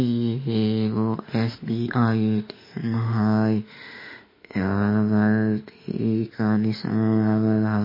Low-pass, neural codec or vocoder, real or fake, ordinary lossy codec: 5.4 kHz; autoencoder, 48 kHz, 32 numbers a frame, DAC-VAE, trained on Japanese speech; fake; MP3, 24 kbps